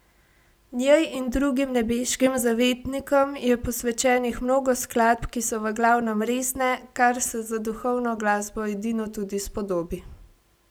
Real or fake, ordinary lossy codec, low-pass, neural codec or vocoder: real; none; none; none